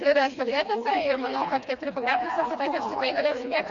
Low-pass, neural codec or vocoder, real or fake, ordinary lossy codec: 7.2 kHz; codec, 16 kHz, 2 kbps, FreqCodec, smaller model; fake; Opus, 64 kbps